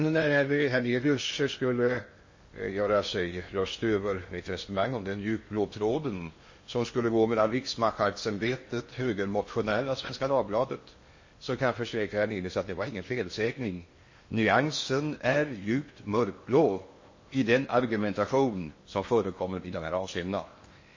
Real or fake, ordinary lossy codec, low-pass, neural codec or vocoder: fake; MP3, 32 kbps; 7.2 kHz; codec, 16 kHz in and 24 kHz out, 0.8 kbps, FocalCodec, streaming, 65536 codes